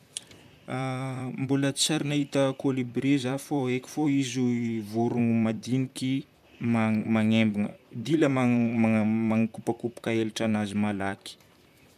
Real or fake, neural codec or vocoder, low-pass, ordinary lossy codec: fake; vocoder, 44.1 kHz, 128 mel bands, Pupu-Vocoder; 14.4 kHz; none